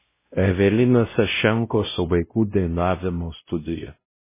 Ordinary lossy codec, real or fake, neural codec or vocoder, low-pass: MP3, 16 kbps; fake; codec, 16 kHz, 0.5 kbps, X-Codec, WavLM features, trained on Multilingual LibriSpeech; 3.6 kHz